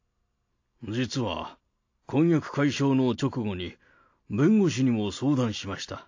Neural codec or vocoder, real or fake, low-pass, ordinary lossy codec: none; real; 7.2 kHz; AAC, 48 kbps